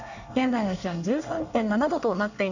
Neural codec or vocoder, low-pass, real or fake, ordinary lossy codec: codec, 24 kHz, 1 kbps, SNAC; 7.2 kHz; fake; none